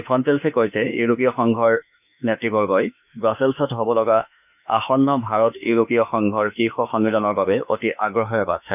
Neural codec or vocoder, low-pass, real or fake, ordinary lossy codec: autoencoder, 48 kHz, 32 numbers a frame, DAC-VAE, trained on Japanese speech; 3.6 kHz; fake; none